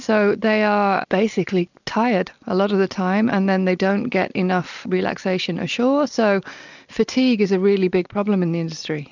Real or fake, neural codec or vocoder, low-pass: real; none; 7.2 kHz